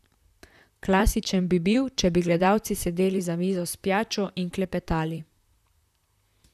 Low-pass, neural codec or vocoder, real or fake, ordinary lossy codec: 14.4 kHz; vocoder, 44.1 kHz, 128 mel bands, Pupu-Vocoder; fake; none